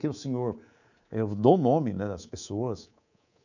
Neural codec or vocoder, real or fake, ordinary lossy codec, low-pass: codec, 24 kHz, 3.1 kbps, DualCodec; fake; none; 7.2 kHz